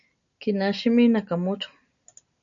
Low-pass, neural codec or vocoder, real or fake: 7.2 kHz; none; real